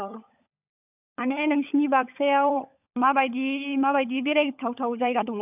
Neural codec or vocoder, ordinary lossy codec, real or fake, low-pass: codec, 16 kHz, 16 kbps, FunCodec, trained on LibriTTS, 50 frames a second; none; fake; 3.6 kHz